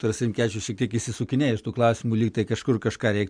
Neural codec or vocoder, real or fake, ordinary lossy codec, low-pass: none; real; MP3, 96 kbps; 9.9 kHz